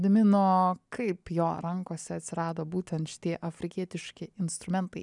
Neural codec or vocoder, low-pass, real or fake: none; 10.8 kHz; real